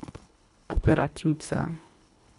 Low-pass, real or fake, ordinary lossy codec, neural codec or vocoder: 10.8 kHz; fake; none; codec, 24 kHz, 1.5 kbps, HILCodec